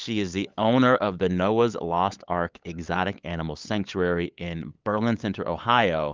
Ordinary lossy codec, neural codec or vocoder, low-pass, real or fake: Opus, 32 kbps; codec, 16 kHz, 8 kbps, FunCodec, trained on LibriTTS, 25 frames a second; 7.2 kHz; fake